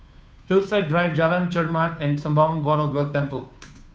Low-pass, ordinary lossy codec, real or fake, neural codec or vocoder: none; none; fake; codec, 16 kHz, 2 kbps, FunCodec, trained on Chinese and English, 25 frames a second